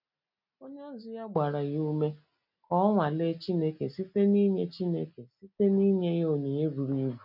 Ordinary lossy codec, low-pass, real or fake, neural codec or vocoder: none; 5.4 kHz; real; none